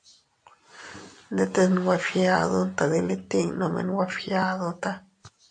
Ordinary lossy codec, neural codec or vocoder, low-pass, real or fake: AAC, 64 kbps; none; 9.9 kHz; real